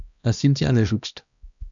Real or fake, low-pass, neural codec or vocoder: fake; 7.2 kHz; codec, 16 kHz, 1 kbps, X-Codec, HuBERT features, trained on balanced general audio